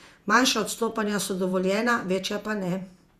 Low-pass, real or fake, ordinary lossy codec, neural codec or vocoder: 14.4 kHz; fake; Opus, 64 kbps; vocoder, 48 kHz, 128 mel bands, Vocos